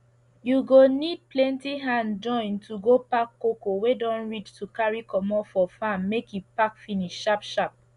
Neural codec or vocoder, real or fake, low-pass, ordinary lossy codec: none; real; 10.8 kHz; Opus, 64 kbps